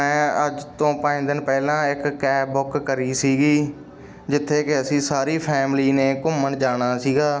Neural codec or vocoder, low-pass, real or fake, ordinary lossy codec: none; none; real; none